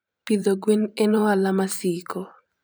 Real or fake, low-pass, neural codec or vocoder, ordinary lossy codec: fake; none; vocoder, 44.1 kHz, 128 mel bands every 512 samples, BigVGAN v2; none